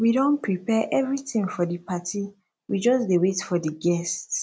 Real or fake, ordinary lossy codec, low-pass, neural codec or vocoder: real; none; none; none